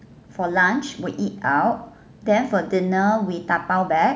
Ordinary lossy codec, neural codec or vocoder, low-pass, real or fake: none; none; none; real